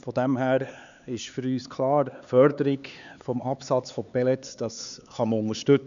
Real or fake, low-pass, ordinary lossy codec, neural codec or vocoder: fake; 7.2 kHz; none; codec, 16 kHz, 4 kbps, X-Codec, HuBERT features, trained on LibriSpeech